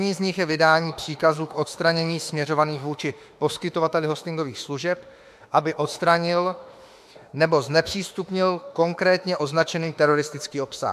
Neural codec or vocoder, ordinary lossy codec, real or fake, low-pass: autoencoder, 48 kHz, 32 numbers a frame, DAC-VAE, trained on Japanese speech; AAC, 96 kbps; fake; 14.4 kHz